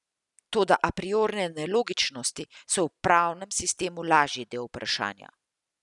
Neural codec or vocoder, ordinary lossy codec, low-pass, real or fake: none; none; 10.8 kHz; real